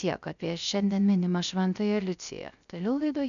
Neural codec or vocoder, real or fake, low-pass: codec, 16 kHz, 0.3 kbps, FocalCodec; fake; 7.2 kHz